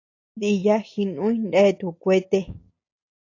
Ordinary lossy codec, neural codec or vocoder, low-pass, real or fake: AAC, 48 kbps; none; 7.2 kHz; real